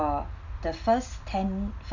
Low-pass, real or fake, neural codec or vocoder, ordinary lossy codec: 7.2 kHz; real; none; none